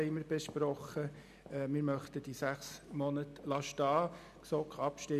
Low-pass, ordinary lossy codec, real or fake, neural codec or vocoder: 14.4 kHz; none; real; none